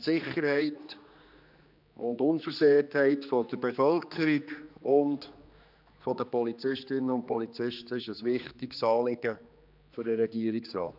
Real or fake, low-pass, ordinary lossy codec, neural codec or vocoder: fake; 5.4 kHz; none; codec, 16 kHz, 2 kbps, X-Codec, HuBERT features, trained on general audio